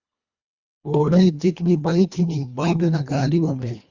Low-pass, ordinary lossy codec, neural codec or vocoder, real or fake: 7.2 kHz; Opus, 64 kbps; codec, 24 kHz, 1.5 kbps, HILCodec; fake